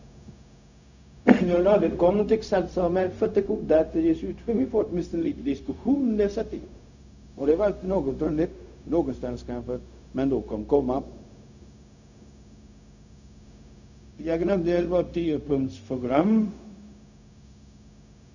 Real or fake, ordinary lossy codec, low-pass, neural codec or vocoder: fake; none; 7.2 kHz; codec, 16 kHz, 0.4 kbps, LongCat-Audio-Codec